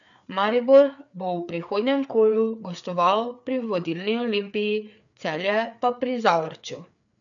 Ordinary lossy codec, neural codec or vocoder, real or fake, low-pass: none; codec, 16 kHz, 4 kbps, FreqCodec, larger model; fake; 7.2 kHz